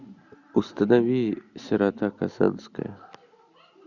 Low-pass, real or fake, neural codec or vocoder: 7.2 kHz; real; none